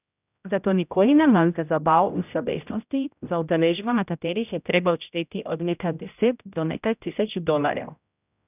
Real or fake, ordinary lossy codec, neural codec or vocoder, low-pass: fake; none; codec, 16 kHz, 0.5 kbps, X-Codec, HuBERT features, trained on general audio; 3.6 kHz